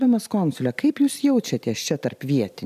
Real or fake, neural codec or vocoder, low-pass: fake; vocoder, 44.1 kHz, 128 mel bands every 256 samples, BigVGAN v2; 14.4 kHz